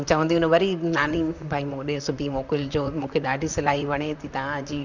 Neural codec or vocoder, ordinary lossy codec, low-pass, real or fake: vocoder, 44.1 kHz, 128 mel bands, Pupu-Vocoder; none; 7.2 kHz; fake